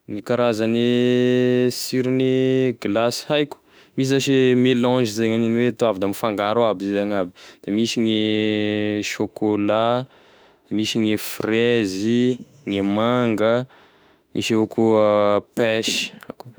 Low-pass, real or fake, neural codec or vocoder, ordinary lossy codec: none; fake; autoencoder, 48 kHz, 32 numbers a frame, DAC-VAE, trained on Japanese speech; none